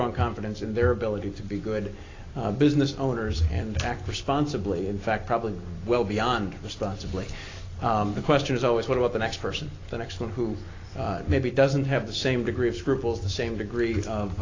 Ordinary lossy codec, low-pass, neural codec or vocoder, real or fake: AAC, 32 kbps; 7.2 kHz; none; real